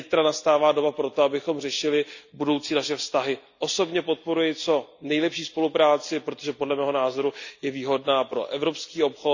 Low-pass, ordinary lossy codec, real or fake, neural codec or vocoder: 7.2 kHz; none; real; none